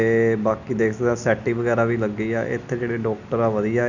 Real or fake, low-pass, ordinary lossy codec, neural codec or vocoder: real; 7.2 kHz; none; none